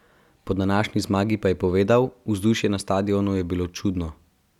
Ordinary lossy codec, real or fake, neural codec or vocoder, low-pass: none; real; none; 19.8 kHz